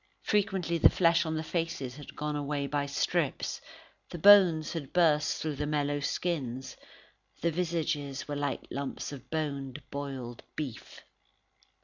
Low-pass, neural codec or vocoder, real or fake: 7.2 kHz; none; real